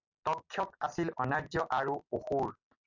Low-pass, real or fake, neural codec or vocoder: 7.2 kHz; real; none